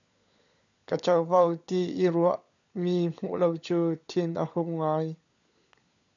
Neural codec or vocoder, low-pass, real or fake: codec, 16 kHz, 16 kbps, FunCodec, trained on LibriTTS, 50 frames a second; 7.2 kHz; fake